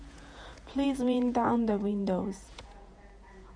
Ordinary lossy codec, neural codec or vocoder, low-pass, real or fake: MP3, 96 kbps; vocoder, 48 kHz, 128 mel bands, Vocos; 9.9 kHz; fake